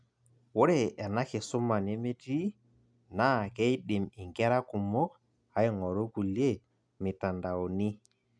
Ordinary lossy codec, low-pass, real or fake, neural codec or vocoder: none; 9.9 kHz; real; none